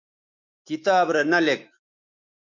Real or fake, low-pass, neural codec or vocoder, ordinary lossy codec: fake; 7.2 kHz; autoencoder, 48 kHz, 128 numbers a frame, DAC-VAE, trained on Japanese speech; MP3, 64 kbps